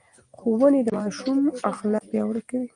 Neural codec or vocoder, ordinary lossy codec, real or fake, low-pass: none; Opus, 32 kbps; real; 9.9 kHz